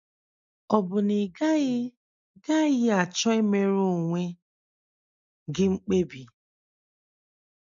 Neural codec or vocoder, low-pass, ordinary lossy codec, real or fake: none; 7.2 kHz; MP3, 64 kbps; real